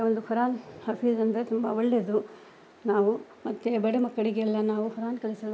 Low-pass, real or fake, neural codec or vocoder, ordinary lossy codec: none; real; none; none